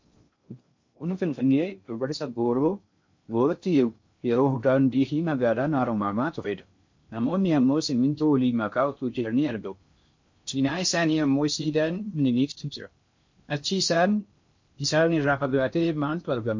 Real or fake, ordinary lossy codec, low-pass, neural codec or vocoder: fake; MP3, 48 kbps; 7.2 kHz; codec, 16 kHz in and 24 kHz out, 0.6 kbps, FocalCodec, streaming, 2048 codes